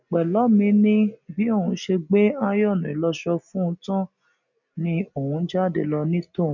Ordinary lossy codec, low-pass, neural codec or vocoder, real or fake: none; 7.2 kHz; none; real